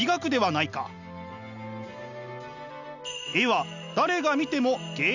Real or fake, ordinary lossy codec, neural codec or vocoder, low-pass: real; none; none; 7.2 kHz